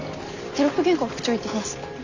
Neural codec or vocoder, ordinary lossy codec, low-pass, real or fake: none; none; 7.2 kHz; real